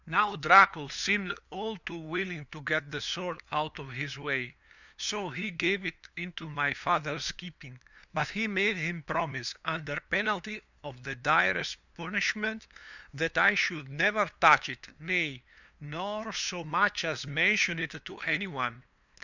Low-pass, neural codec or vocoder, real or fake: 7.2 kHz; codec, 16 kHz, 2 kbps, FunCodec, trained on LibriTTS, 25 frames a second; fake